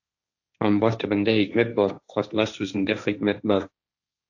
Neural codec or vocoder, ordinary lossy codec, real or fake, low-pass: codec, 16 kHz, 1.1 kbps, Voila-Tokenizer; AAC, 48 kbps; fake; 7.2 kHz